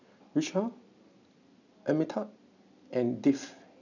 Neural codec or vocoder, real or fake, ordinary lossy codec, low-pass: none; real; none; 7.2 kHz